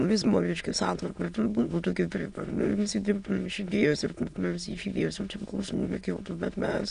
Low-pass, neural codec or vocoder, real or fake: 9.9 kHz; autoencoder, 22.05 kHz, a latent of 192 numbers a frame, VITS, trained on many speakers; fake